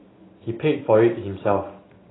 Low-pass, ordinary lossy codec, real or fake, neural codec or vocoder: 7.2 kHz; AAC, 16 kbps; real; none